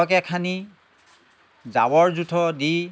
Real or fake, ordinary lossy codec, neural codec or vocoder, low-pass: real; none; none; none